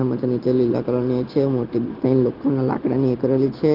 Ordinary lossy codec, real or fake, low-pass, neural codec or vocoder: Opus, 16 kbps; real; 5.4 kHz; none